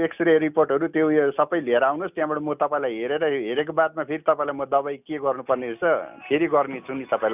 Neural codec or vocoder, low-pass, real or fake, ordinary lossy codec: none; 3.6 kHz; real; none